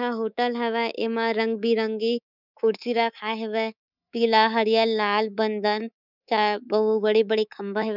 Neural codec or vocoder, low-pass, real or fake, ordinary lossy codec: codec, 24 kHz, 3.1 kbps, DualCodec; 5.4 kHz; fake; none